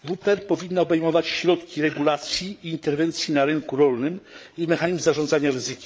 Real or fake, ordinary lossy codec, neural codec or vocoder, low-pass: fake; none; codec, 16 kHz, 8 kbps, FreqCodec, larger model; none